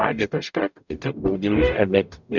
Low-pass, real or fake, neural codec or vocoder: 7.2 kHz; fake; codec, 44.1 kHz, 0.9 kbps, DAC